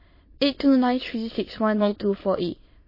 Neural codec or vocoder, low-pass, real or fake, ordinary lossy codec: autoencoder, 22.05 kHz, a latent of 192 numbers a frame, VITS, trained on many speakers; 5.4 kHz; fake; MP3, 24 kbps